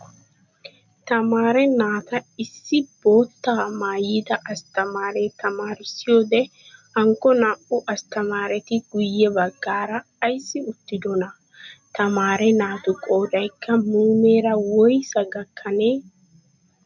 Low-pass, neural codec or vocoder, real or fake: 7.2 kHz; none; real